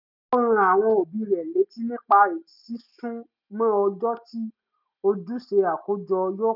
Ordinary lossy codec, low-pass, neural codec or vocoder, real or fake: none; 5.4 kHz; none; real